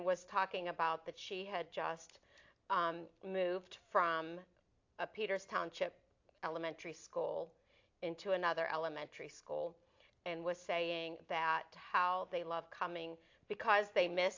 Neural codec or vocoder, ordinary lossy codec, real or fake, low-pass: none; AAC, 48 kbps; real; 7.2 kHz